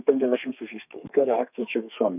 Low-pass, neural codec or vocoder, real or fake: 3.6 kHz; codec, 32 kHz, 1.9 kbps, SNAC; fake